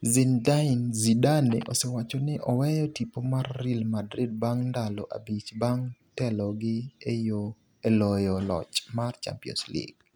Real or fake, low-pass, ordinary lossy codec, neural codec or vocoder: real; none; none; none